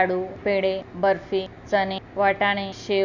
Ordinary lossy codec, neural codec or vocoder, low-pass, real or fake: none; none; 7.2 kHz; real